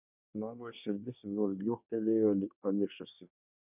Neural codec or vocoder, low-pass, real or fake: codec, 24 kHz, 1 kbps, SNAC; 3.6 kHz; fake